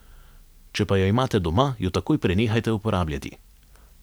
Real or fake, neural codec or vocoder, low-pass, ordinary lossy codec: real; none; none; none